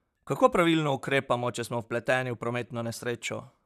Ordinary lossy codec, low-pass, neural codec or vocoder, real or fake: none; 14.4 kHz; vocoder, 44.1 kHz, 128 mel bands, Pupu-Vocoder; fake